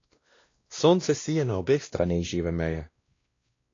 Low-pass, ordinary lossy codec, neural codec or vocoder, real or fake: 7.2 kHz; AAC, 32 kbps; codec, 16 kHz, 1 kbps, X-Codec, WavLM features, trained on Multilingual LibriSpeech; fake